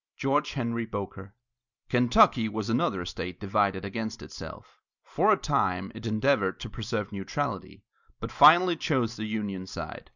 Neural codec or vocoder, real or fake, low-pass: none; real; 7.2 kHz